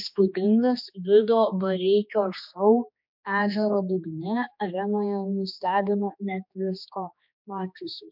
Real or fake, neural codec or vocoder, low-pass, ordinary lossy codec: fake; codec, 16 kHz, 2 kbps, X-Codec, HuBERT features, trained on general audio; 5.4 kHz; MP3, 48 kbps